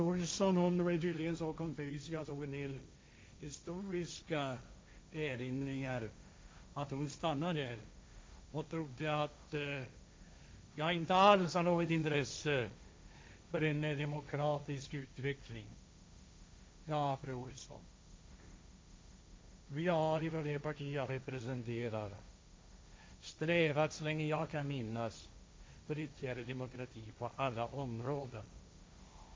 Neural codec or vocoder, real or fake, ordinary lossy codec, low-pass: codec, 16 kHz, 1.1 kbps, Voila-Tokenizer; fake; none; none